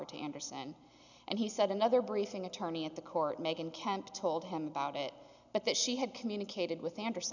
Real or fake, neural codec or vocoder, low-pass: real; none; 7.2 kHz